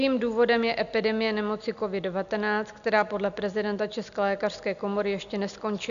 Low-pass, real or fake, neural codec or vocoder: 7.2 kHz; real; none